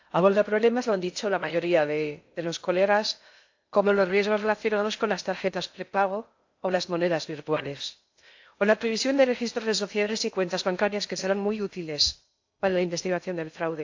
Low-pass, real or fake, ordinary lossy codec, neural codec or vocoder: 7.2 kHz; fake; AAC, 48 kbps; codec, 16 kHz in and 24 kHz out, 0.6 kbps, FocalCodec, streaming, 2048 codes